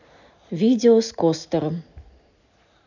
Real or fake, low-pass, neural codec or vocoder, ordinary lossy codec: real; 7.2 kHz; none; none